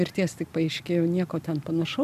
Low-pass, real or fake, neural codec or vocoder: 14.4 kHz; real; none